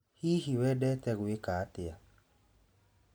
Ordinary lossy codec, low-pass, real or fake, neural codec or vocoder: none; none; real; none